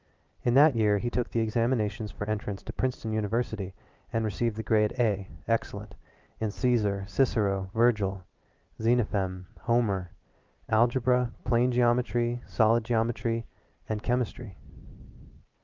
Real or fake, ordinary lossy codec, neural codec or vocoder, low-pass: real; Opus, 32 kbps; none; 7.2 kHz